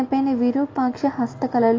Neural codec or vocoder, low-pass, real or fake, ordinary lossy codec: none; 7.2 kHz; real; AAC, 32 kbps